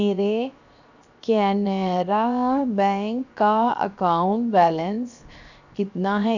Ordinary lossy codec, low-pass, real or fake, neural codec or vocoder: none; 7.2 kHz; fake; codec, 16 kHz, 0.7 kbps, FocalCodec